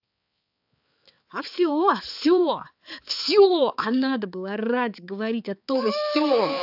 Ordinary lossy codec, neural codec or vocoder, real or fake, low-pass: AAC, 48 kbps; codec, 16 kHz, 4 kbps, X-Codec, HuBERT features, trained on balanced general audio; fake; 5.4 kHz